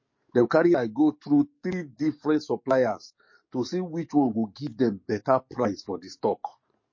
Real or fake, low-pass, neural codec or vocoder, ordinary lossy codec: fake; 7.2 kHz; codec, 44.1 kHz, 7.8 kbps, DAC; MP3, 32 kbps